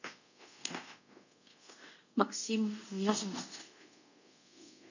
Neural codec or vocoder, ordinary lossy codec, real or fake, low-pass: codec, 24 kHz, 0.5 kbps, DualCodec; none; fake; 7.2 kHz